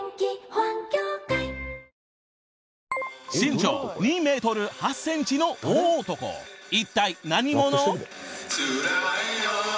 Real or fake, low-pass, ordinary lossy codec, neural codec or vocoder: real; none; none; none